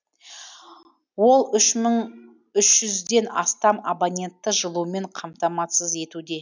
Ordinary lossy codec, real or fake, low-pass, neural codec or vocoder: none; real; 7.2 kHz; none